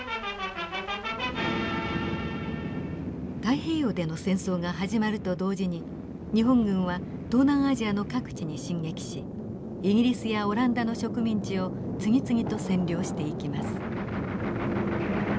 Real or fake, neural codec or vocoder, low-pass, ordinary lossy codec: real; none; none; none